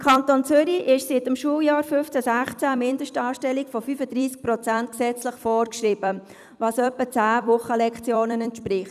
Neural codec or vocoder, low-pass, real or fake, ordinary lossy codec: vocoder, 44.1 kHz, 128 mel bands every 256 samples, BigVGAN v2; 14.4 kHz; fake; none